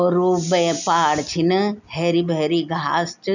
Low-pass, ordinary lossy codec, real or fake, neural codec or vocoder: 7.2 kHz; none; real; none